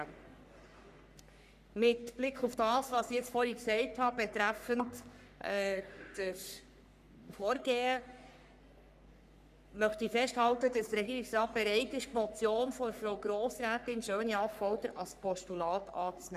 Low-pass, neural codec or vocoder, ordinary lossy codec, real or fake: 14.4 kHz; codec, 44.1 kHz, 3.4 kbps, Pupu-Codec; none; fake